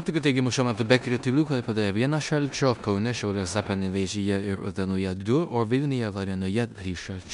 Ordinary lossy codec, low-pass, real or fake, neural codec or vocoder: MP3, 96 kbps; 10.8 kHz; fake; codec, 16 kHz in and 24 kHz out, 0.9 kbps, LongCat-Audio-Codec, four codebook decoder